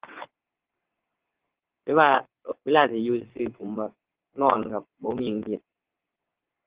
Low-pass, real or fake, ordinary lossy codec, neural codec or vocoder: 3.6 kHz; fake; Opus, 16 kbps; vocoder, 22.05 kHz, 80 mel bands, WaveNeXt